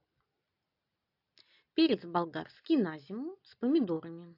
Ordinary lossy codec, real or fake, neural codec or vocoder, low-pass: AAC, 32 kbps; real; none; 5.4 kHz